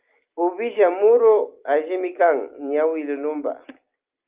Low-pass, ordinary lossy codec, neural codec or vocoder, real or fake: 3.6 kHz; Opus, 32 kbps; none; real